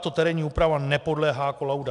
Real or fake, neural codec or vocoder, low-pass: real; none; 10.8 kHz